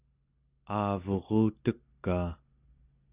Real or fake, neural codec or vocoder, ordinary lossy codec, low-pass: real; none; Opus, 64 kbps; 3.6 kHz